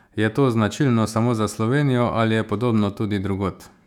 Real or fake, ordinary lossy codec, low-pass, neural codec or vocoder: fake; none; 19.8 kHz; autoencoder, 48 kHz, 128 numbers a frame, DAC-VAE, trained on Japanese speech